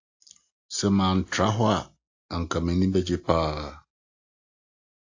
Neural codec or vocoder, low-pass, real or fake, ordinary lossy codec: none; 7.2 kHz; real; AAC, 48 kbps